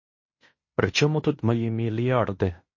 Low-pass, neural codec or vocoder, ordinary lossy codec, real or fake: 7.2 kHz; codec, 16 kHz in and 24 kHz out, 0.9 kbps, LongCat-Audio-Codec, fine tuned four codebook decoder; MP3, 32 kbps; fake